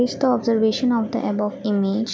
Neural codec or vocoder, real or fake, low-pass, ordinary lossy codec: none; real; none; none